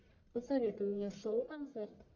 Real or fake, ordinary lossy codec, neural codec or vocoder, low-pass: fake; MP3, 48 kbps; codec, 44.1 kHz, 1.7 kbps, Pupu-Codec; 7.2 kHz